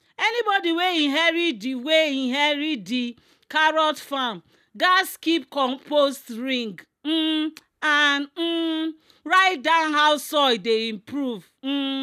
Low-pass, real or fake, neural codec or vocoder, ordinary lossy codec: 14.4 kHz; real; none; none